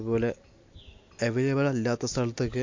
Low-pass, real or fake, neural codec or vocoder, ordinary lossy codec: 7.2 kHz; real; none; MP3, 48 kbps